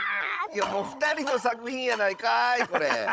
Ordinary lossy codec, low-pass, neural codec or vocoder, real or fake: none; none; codec, 16 kHz, 16 kbps, FunCodec, trained on Chinese and English, 50 frames a second; fake